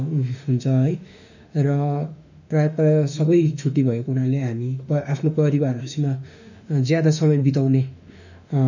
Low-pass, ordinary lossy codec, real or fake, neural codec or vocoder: 7.2 kHz; none; fake; autoencoder, 48 kHz, 32 numbers a frame, DAC-VAE, trained on Japanese speech